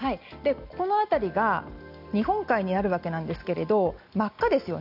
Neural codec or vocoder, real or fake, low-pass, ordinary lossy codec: none; real; 5.4 kHz; none